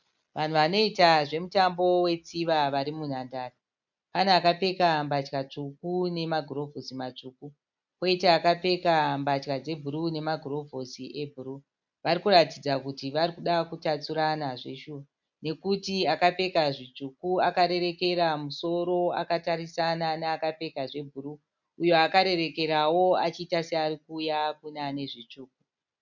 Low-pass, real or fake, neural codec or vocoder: 7.2 kHz; real; none